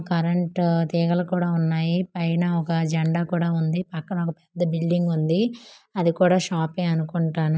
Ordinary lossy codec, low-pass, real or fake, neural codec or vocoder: none; none; real; none